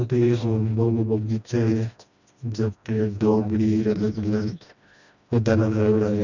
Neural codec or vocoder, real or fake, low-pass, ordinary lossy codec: codec, 16 kHz, 1 kbps, FreqCodec, smaller model; fake; 7.2 kHz; none